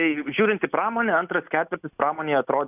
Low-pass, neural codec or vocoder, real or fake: 3.6 kHz; none; real